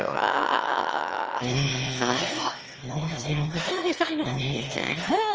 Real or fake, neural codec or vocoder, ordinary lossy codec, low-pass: fake; autoencoder, 22.05 kHz, a latent of 192 numbers a frame, VITS, trained on one speaker; Opus, 24 kbps; 7.2 kHz